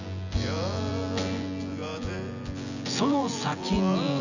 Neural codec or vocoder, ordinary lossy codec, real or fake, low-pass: vocoder, 24 kHz, 100 mel bands, Vocos; none; fake; 7.2 kHz